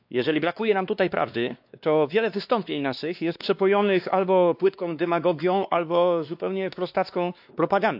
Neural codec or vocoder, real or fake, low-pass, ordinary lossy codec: codec, 16 kHz, 2 kbps, X-Codec, WavLM features, trained on Multilingual LibriSpeech; fake; 5.4 kHz; AAC, 48 kbps